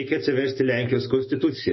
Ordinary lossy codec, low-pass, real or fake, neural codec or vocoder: MP3, 24 kbps; 7.2 kHz; real; none